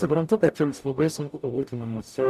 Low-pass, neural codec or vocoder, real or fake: 14.4 kHz; codec, 44.1 kHz, 0.9 kbps, DAC; fake